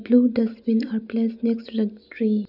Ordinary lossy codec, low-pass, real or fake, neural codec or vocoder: none; 5.4 kHz; real; none